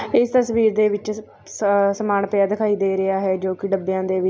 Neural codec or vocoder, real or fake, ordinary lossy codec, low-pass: none; real; none; none